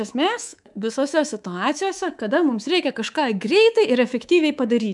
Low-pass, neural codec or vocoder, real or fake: 10.8 kHz; codec, 24 kHz, 3.1 kbps, DualCodec; fake